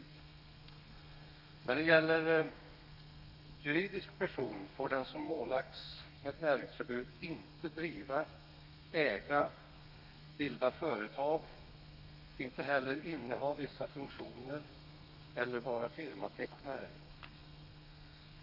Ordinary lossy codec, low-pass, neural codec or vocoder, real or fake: none; 5.4 kHz; codec, 32 kHz, 1.9 kbps, SNAC; fake